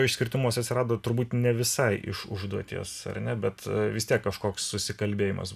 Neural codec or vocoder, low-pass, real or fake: none; 14.4 kHz; real